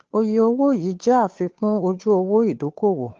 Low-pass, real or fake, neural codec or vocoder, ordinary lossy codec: 7.2 kHz; fake; codec, 16 kHz, 2 kbps, FunCodec, trained on Chinese and English, 25 frames a second; Opus, 16 kbps